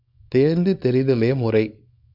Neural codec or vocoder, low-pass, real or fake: codec, 24 kHz, 0.9 kbps, WavTokenizer, small release; 5.4 kHz; fake